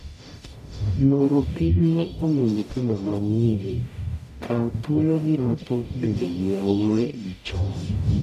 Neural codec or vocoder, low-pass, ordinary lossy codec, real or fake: codec, 44.1 kHz, 0.9 kbps, DAC; 14.4 kHz; none; fake